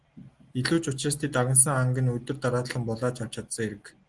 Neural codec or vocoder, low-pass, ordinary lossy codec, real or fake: none; 10.8 kHz; Opus, 24 kbps; real